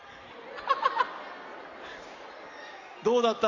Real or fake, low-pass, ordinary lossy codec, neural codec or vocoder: real; 7.2 kHz; AAC, 48 kbps; none